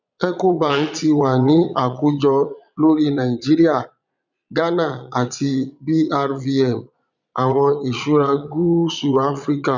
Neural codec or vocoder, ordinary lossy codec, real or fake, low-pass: vocoder, 22.05 kHz, 80 mel bands, Vocos; none; fake; 7.2 kHz